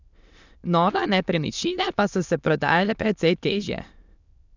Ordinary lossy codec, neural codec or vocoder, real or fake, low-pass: none; autoencoder, 22.05 kHz, a latent of 192 numbers a frame, VITS, trained on many speakers; fake; 7.2 kHz